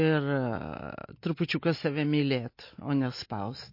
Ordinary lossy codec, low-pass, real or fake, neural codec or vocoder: MP3, 32 kbps; 5.4 kHz; real; none